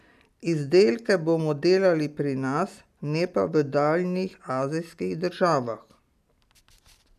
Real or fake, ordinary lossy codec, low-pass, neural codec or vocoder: real; none; 14.4 kHz; none